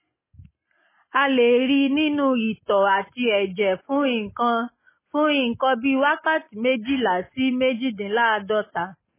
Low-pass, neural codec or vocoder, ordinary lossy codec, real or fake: 3.6 kHz; none; MP3, 16 kbps; real